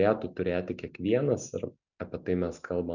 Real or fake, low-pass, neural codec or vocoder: real; 7.2 kHz; none